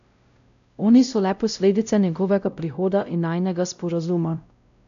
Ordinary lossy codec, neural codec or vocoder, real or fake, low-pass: none; codec, 16 kHz, 0.5 kbps, X-Codec, WavLM features, trained on Multilingual LibriSpeech; fake; 7.2 kHz